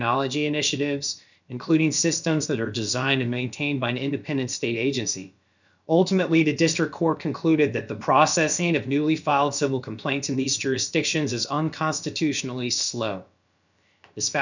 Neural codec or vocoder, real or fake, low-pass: codec, 16 kHz, about 1 kbps, DyCAST, with the encoder's durations; fake; 7.2 kHz